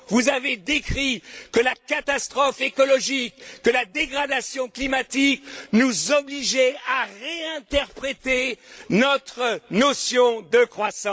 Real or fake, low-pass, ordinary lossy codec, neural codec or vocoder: fake; none; none; codec, 16 kHz, 16 kbps, FreqCodec, larger model